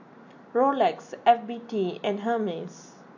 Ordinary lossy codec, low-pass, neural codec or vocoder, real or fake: MP3, 48 kbps; 7.2 kHz; none; real